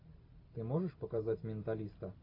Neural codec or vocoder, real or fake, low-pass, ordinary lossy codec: none; real; 5.4 kHz; AAC, 32 kbps